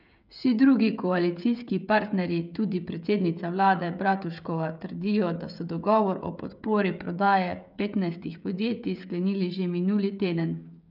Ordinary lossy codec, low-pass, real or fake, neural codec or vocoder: none; 5.4 kHz; fake; codec, 16 kHz, 16 kbps, FreqCodec, smaller model